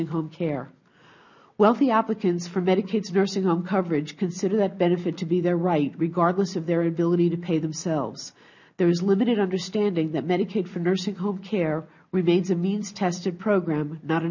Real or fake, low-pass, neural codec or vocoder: real; 7.2 kHz; none